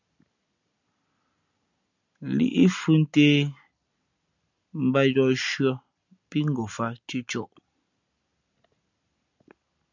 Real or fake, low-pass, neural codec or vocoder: real; 7.2 kHz; none